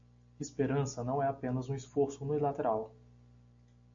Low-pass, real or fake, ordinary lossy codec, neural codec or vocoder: 7.2 kHz; real; AAC, 64 kbps; none